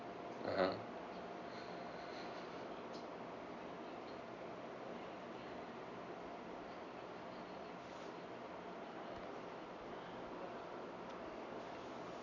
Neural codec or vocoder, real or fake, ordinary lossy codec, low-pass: none; real; none; 7.2 kHz